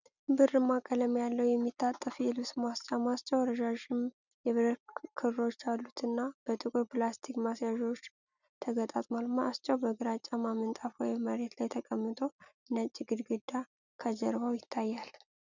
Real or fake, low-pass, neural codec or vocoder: real; 7.2 kHz; none